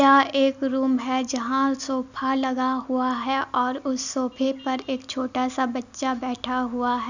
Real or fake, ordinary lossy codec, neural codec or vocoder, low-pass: real; none; none; 7.2 kHz